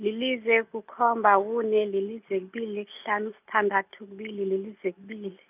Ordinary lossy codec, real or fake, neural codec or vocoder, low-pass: none; real; none; 3.6 kHz